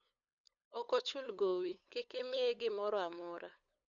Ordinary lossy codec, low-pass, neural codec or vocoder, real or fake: none; 7.2 kHz; codec, 16 kHz, 8 kbps, FunCodec, trained on LibriTTS, 25 frames a second; fake